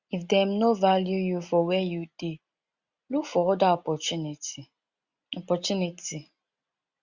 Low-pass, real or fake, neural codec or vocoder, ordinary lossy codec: 7.2 kHz; real; none; Opus, 64 kbps